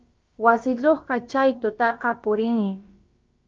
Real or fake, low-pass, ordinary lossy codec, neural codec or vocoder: fake; 7.2 kHz; Opus, 24 kbps; codec, 16 kHz, about 1 kbps, DyCAST, with the encoder's durations